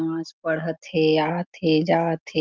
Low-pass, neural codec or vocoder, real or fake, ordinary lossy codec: 7.2 kHz; none; real; Opus, 32 kbps